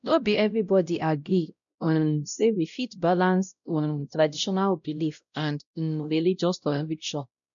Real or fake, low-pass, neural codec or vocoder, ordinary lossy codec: fake; 7.2 kHz; codec, 16 kHz, 0.5 kbps, X-Codec, WavLM features, trained on Multilingual LibriSpeech; none